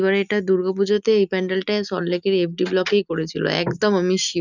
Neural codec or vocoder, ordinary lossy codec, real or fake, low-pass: none; none; real; 7.2 kHz